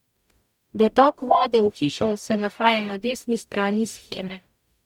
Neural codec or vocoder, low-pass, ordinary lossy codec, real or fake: codec, 44.1 kHz, 0.9 kbps, DAC; 19.8 kHz; MP3, 96 kbps; fake